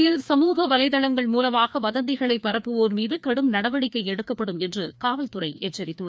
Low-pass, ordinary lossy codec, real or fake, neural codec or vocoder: none; none; fake; codec, 16 kHz, 2 kbps, FreqCodec, larger model